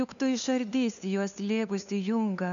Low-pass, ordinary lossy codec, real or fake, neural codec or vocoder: 7.2 kHz; MP3, 96 kbps; fake; codec, 16 kHz, 2 kbps, FunCodec, trained on LibriTTS, 25 frames a second